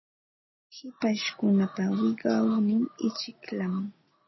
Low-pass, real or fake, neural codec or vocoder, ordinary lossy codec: 7.2 kHz; real; none; MP3, 24 kbps